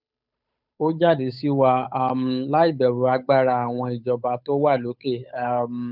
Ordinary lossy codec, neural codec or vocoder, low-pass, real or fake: none; codec, 16 kHz, 8 kbps, FunCodec, trained on Chinese and English, 25 frames a second; 5.4 kHz; fake